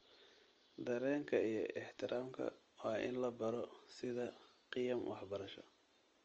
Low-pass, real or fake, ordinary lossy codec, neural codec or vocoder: 7.2 kHz; real; Opus, 24 kbps; none